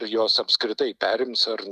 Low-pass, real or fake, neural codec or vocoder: 14.4 kHz; real; none